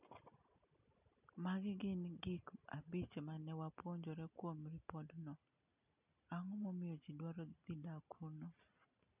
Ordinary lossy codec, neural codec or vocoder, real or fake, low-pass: none; none; real; 3.6 kHz